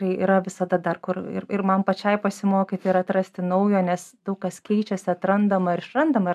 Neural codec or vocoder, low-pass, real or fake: none; 14.4 kHz; real